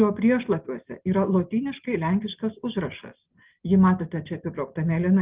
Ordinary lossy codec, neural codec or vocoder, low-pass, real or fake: Opus, 32 kbps; none; 3.6 kHz; real